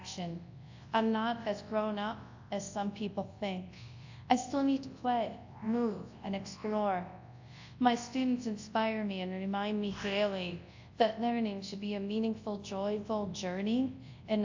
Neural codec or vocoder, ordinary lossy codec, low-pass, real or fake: codec, 24 kHz, 0.9 kbps, WavTokenizer, large speech release; AAC, 48 kbps; 7.2 kHz; fake